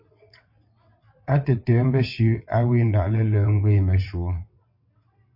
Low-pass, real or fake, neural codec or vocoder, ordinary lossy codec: 5.4 kHz; fake; vocoder, 22.05 kHz, 80 mel bands, WaveNeXt; MP3, 32 kbps